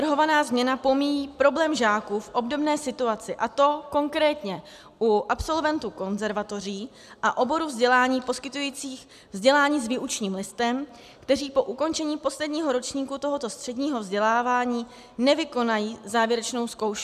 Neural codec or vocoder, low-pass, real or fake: none; 14.4 kHz; real